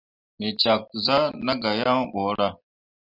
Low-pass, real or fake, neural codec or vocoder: 5.4 kHz; real; none